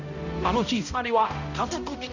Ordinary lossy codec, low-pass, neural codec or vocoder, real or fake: none; 7.2 kHz; codec, 16 kHz, 0.5 kbps, X-Codec, HuBERT features, trained on balanced general audio; fake